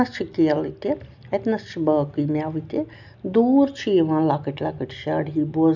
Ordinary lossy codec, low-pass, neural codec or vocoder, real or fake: none; 7.2 kHz; none; real